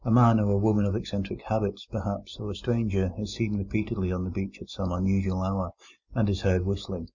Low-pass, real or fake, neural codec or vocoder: 7.2 kHz; real; none